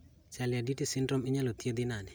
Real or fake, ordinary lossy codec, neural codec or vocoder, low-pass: real; none; none; none